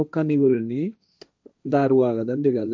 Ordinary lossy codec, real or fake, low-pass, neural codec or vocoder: none; fake; none; codec, 16 kHz, 1.1 kbps, Voila-Tokenizer